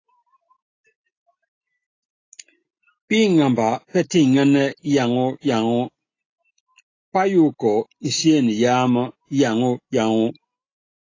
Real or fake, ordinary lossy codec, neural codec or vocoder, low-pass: real; AAC, 32 kbps; none; 7.2 kHz